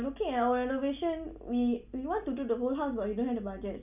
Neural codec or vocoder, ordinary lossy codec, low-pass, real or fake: none; none; 3.6 kHz; real